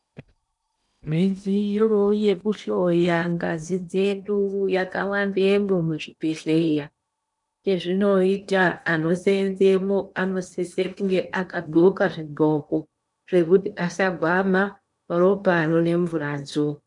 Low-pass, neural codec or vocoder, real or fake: 10.8 kHz; codec, 16 kHz in and 24 kHz out, 0.8 kbps, FocalCodec, streaming, 65536 codes; fake